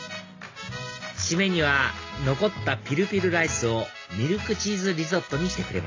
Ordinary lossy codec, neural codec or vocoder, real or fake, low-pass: AAC, 32 kbps; none; real; 7.2 kHz